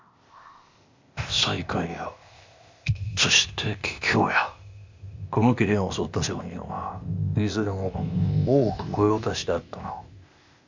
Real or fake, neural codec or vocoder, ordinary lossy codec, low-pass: fake; codec, 16 kHz, 0.8 kbps, ZipCodec; MP3, 64 kbps; 7.2 kHz